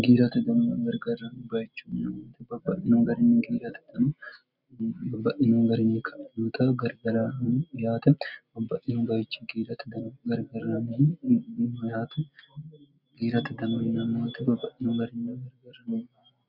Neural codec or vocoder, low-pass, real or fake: none; 5.4 kHz; real